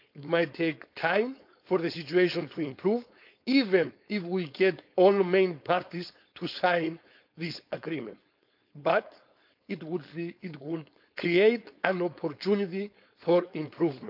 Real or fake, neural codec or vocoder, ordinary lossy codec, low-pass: fake; codec, 16 kHz, 4.8 kbps, FACodec; none; 5.4 kHz